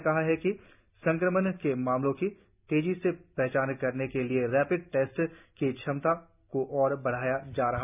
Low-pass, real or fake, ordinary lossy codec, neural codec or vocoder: 3.6 kHz; real; none; none